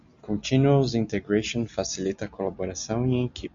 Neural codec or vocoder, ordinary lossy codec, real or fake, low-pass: none; AAC, 64 kbps; real; 7.2 kHz